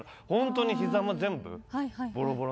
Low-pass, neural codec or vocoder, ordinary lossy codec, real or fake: none; none; none; real